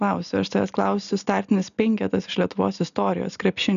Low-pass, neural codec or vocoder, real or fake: 7.2 kHz; none; real